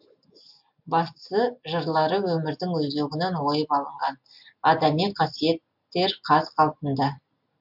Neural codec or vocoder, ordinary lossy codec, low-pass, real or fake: none; MP3, 48 kbps; 5.4 kHz; real